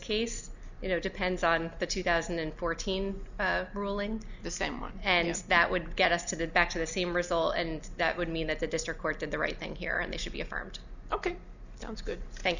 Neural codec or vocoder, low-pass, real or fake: none; 7.2 kHz; real